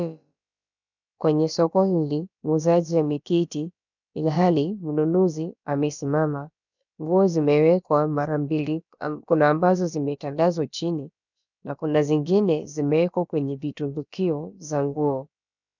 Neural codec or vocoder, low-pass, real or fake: codec, 16 kHz, about 1 kbps, DyCAST, with the encoder's durations; 7.2 kHz; fake